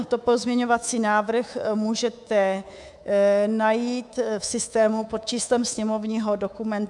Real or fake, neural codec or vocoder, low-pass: fake; codec, 24 kHz, 3.1 kbps, DualCodec; 10.8 kHz